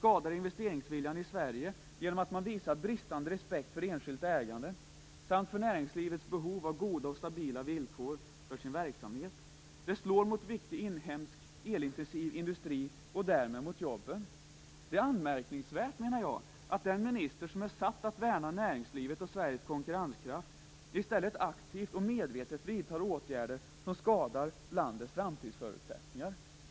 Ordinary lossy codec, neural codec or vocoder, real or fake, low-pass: none; none; real; none